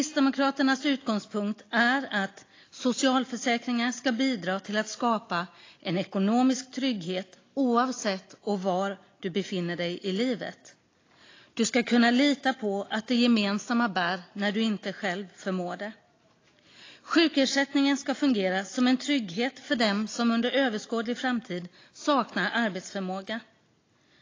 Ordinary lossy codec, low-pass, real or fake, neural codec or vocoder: AAC, 32 kbps; 7.2 kHz; real; none